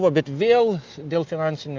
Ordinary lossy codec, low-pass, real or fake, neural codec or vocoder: Opus, 24 kbps; 7.2 kHz; real; none